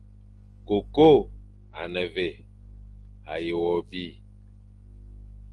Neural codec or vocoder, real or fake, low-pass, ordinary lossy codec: none; real; 10.8 kHz; Opus, 24 kbps